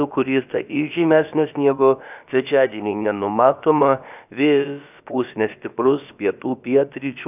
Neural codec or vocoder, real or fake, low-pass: codec, 16 kHz, about 1 kbps, DyCAST, with the encoder's durations; fake; 3.6 kHz